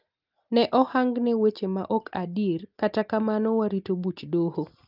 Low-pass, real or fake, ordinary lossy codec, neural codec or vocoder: 5.4 kHz; real; Opus, 24 kbps; none